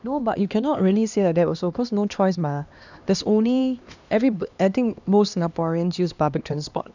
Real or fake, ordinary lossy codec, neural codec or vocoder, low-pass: fake; none; codec, 16 kHz, 2 kbps, X-Codec, HuBERT features, trained on LibriSpeech; 7.2 kHz